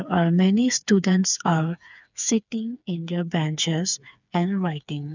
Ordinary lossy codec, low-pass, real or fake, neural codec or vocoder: none; 7.2 kHz; fake; codec, 16 kHz, 4 kbps, FreqCodec, smaller model